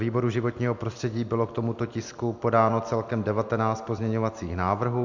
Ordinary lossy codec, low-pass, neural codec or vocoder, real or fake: MP3, 64 kbps; 7.2 kHz; none; real